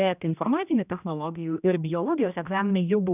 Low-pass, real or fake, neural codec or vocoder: 3.6 kHz; fake; codec, 16 kHz, 1 kbps, X-Codec, HuBERT features, trained on general audio